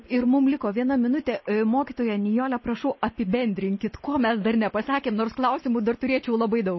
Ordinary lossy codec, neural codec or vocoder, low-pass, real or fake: MP3, 24 kbps; none; 7.2 kHz; real